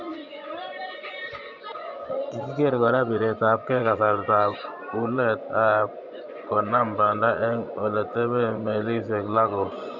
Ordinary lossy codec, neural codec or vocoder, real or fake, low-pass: none; vocoder, 24 kHz, 100 mel bands, Vocos; fake; 7.2 kHz